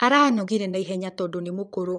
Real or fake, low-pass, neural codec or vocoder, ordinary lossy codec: fake; 9.9 kHz; vocoder, 22.05 kHz, 80 mel bands, Vocos; none